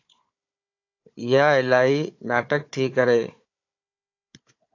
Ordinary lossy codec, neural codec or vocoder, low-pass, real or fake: AAC, 48 kbps; codec, 16 kHz, 4 kbps, FunCodec, trained on Chinese and English, 50 frames a second; 7.2 kHz; fake